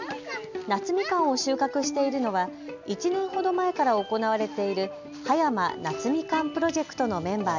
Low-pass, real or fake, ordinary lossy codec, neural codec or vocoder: 7.2 kHz; real; none; none